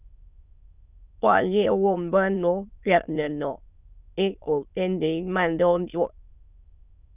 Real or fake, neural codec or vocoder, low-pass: fake; autoencoder, 22.05 kHz, a latent of 192 numbers a frame, VITS, trained on many speakers; 3.6 kHz